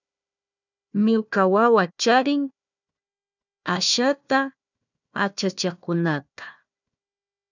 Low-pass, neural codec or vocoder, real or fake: 7.2 kHz; codec, 16 kHz, 1 kbps, FunCodec, trained on Chinese and English, 50 frames a second; fake